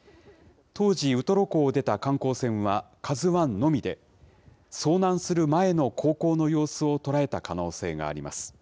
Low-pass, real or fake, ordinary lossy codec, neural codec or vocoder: none; real; none; none